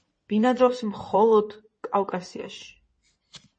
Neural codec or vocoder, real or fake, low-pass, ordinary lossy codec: codec, 16 kHz in and 24 kHz out, 2.2 kbps, FireRedTTS-2 codec; fake; 9.9 kHz; MP3, 32 kbps